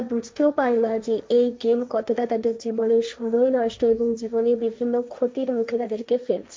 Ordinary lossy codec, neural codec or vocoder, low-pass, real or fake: none; codec, 16 kHz, 1.1 kbps, Voila-Tokenizer; none; fake